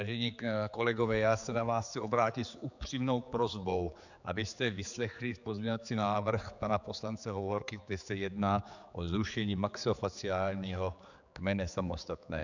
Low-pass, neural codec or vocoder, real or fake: 7.2 kHz; codec, 16 kHz, 4 kbps, X-Codec, HuBERT features, trained on general audio; fake